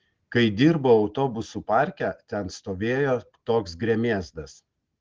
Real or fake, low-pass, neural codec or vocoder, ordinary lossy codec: real; 7.2 kHz; none; Opus, 16 kbps